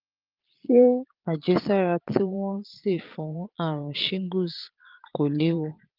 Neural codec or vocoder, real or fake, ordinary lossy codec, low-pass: vocoder, 22.05 kHz, 80 mel bands, Vocos; fake; Opus, 24 kbps; 5.4 kHz